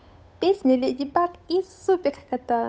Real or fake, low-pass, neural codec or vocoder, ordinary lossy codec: fake; none; codec, 16 kHz, 8 kbps, FunCodec, trained on Chinese and English, 25 frames a second; none